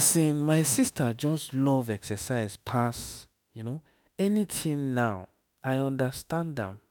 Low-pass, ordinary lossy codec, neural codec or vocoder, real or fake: none; none; autoencoder, 48 kHz, 32 numbers a frame, DAC-VAE, trained on Japanese speech; fake